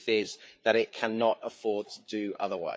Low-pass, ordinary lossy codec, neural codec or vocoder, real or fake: none; none; codec, 16 kHz, 4 kbps, FreqCodec, larger model; fake